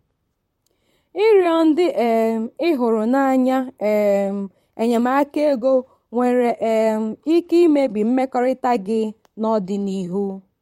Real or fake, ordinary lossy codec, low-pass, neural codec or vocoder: fake; MP3, 64 kbps; 19.8 kHz; vocoder, 44.1 kHz, 128 mel bands, Pupu-Vocoder